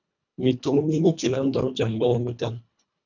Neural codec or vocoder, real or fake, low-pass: codec, 24 kHz, 1.5 kbps, HILCodec; fake; 7.2 kHz